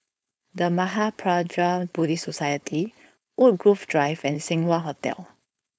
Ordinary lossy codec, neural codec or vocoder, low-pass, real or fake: none; codec, 16 kHz, 4.8 kbps, FACodec; none; fake